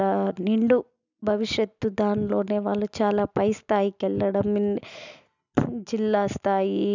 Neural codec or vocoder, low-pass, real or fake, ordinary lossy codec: none; 7.2 kHz; real; none